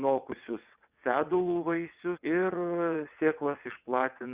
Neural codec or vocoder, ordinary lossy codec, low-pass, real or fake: vocoder, 22.05 kHz, 80 mel bands, WaveNeXt; Opus, 64 kbps; 3.6 kHz; fake